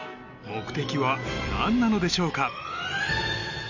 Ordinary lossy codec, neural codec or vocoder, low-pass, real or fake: none; none; 7.2 kHz; real